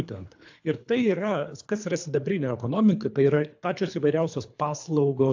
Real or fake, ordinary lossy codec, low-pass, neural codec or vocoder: fake; AAC, 48 kbps; 7.2 kHz; codec, 24 kHz, 3 kbps, HILCodec